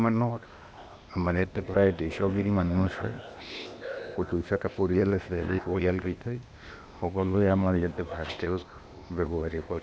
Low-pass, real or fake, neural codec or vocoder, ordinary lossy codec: none; fake; codec, 16 kHz, 0.8 kbps, ZipCodec; none